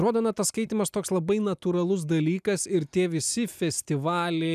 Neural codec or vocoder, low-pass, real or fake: none; 14.4 kHz; real